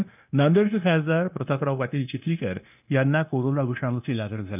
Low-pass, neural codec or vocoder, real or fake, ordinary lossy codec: 3.6 kHz; codec, 16 kHz, 0.9 kbps, LongCat-Audio-Codec; fake; none